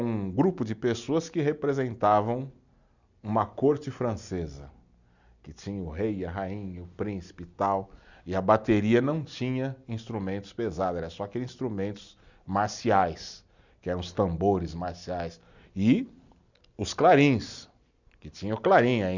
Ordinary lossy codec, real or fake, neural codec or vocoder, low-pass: none; real; none; 7.2 kHz